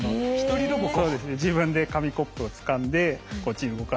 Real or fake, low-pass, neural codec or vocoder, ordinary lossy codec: real; none; none; none